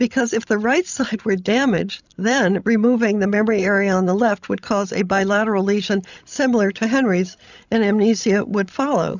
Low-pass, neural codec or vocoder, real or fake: 7.2 kHz; codec, 16 kHz, 16 kbps, FreqCodec, larger model; fake